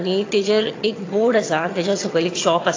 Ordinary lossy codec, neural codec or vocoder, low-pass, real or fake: AAC, 32 kbps; vocoder, 22.05 kHz, 80 mel bands, HiFi-GAN; 7.2 kHz; fake